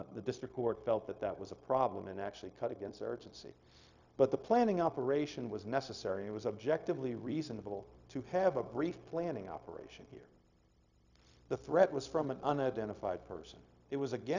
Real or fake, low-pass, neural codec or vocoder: fake; 7.2 kHz; codec, 16 kHz, 0.4 kbps, LongCat-Audio-Codec